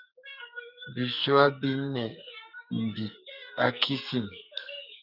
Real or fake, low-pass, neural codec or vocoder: fake; 5.4 kHz; codec, 44.1 kHz, 2.6 kbps, SNAC